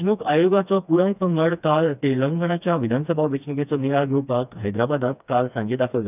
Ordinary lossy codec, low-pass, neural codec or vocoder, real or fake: none; 3.6 kHz; codec, 16 kHz, 2 kbps, FreqCodec, smaller model; fake